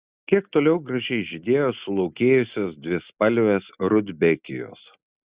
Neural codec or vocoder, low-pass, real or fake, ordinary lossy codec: none; 3.6 kHz; real; Opus, 64 kbps